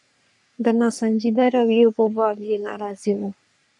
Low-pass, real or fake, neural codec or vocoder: 10.8 kHz; fake; codec, 44.1 kHz, 3.4 kbps, Pupu-Codec